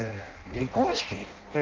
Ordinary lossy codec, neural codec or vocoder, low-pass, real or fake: Opus, 24 kbps; codec, 16 kHz in and 24 kHz out, 0.6 kbps, FireRedTTS-2 codec; 7.2 kHz; fake